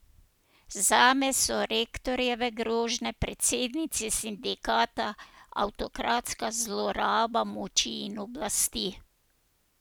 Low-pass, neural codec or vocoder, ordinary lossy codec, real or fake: none; none; none; real